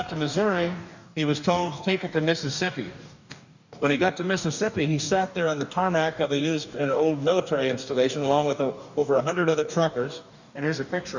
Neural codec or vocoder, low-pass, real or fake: codec, 44.1 kHz, 2.6 kbps, DAC; 7.2 kHz; fake